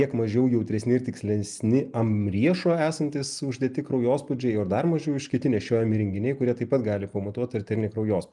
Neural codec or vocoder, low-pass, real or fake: none; 10.8 kHz; real